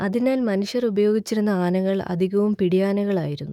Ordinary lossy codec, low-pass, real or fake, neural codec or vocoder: none; 19.8 kHz; fake; autoencoder, 48 kHz, 128 numbers a frame, DAC-VAE, trained on Japanese speech